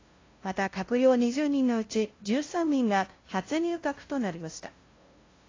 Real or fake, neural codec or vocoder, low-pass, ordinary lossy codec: fake; codec, 16 kHz, 0.5 kbps, FunCodec, trained on LibriTTS, 25 frames a second; 7.2 kHz; AAC, 32 kbps